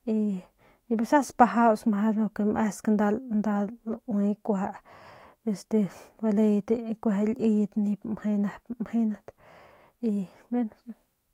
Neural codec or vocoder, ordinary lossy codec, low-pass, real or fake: autoencoder, 48 kHz, 128 numbers a frame, DAC-VAE, trained on Japanese speech; AAC, 48 kbps; 19.8 kHz; fake